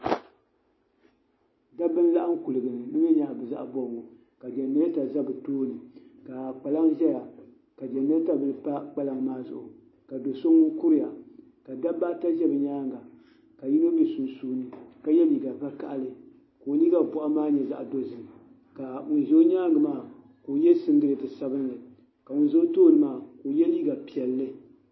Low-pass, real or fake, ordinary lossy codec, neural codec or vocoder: 7.2 kHz; real; MP3, 24 kbps; none